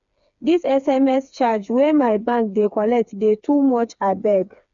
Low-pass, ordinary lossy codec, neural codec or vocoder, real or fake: 7.2 kHz; none; codec, 16 kHz, 4 kbps, FreqCodec, smaller model; fake